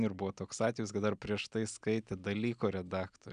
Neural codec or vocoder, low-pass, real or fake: none; 9.9 kHz; real